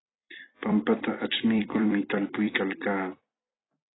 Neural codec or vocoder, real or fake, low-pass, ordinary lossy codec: vocoder, 44.1 kHz, 128 mel bands every 256 samples, BigVGAN v2; fake; 7.2 kHz; AAC, 16 kbps